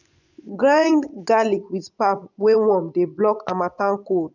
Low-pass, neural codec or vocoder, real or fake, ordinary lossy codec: 7.2 kHz; vocoder, 24 kHz, 100 mel bands, Vocos; fake; none